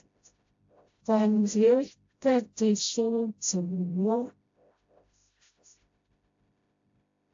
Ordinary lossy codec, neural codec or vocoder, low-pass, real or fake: AAC, 48 kbps; codec, 16 kHz, 0.5 kbps, FreqCodec, smaller model; 7.2 kHz; fake